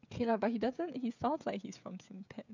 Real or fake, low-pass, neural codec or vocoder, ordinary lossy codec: fake; 7.2 kHz; codec, 16 kHz, 16 kbps, FreqCodec, smaller model; none